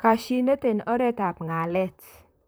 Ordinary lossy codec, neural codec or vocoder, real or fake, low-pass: none; vocoder, 44.1 kHz, 128 mel bands, Pupu-Vocoder; fake; none